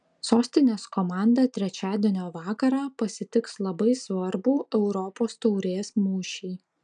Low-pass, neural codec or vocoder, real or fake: 9.9 kHz; none; real